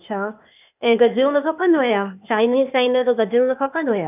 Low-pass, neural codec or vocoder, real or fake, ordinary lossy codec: 3.6 kHz; codec, 16 kHz, 0.8 kbps, ZipCodec; fake; none